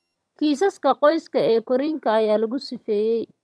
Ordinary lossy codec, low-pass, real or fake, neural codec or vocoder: none; none; fake; vocoder, 22.05 kHz, 80 mel bands, HiFi-GAN